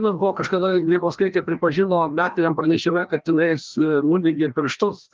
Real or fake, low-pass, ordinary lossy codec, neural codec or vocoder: fake; 7.2 kHz; Opus, 24 kbps; codec, 16 kHz, 1 kbps, FreqCodec, larger model